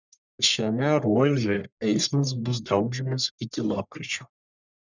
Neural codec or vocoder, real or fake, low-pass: codec, 44.1 kHz, 1.7 kbps, Pupu-Codec; fake; 7.2 kHz